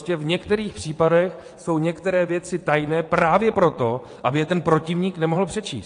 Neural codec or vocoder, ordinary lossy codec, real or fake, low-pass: vocoder, 22.05 kHz, 80 mel bands, WaveNeXt; AAC, 48 kbps; fake; 9.9 kHz